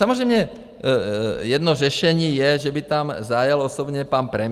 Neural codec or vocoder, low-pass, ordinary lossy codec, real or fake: none; 14.4 kHz; Opus, 32 kbps; real